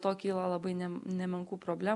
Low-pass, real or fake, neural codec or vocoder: 10.8 kHz; real; none